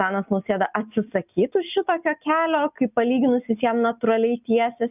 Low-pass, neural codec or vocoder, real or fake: 3.6 kHz; none; real